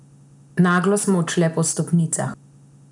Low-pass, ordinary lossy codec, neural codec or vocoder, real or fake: 10.8 kHz; none; none; real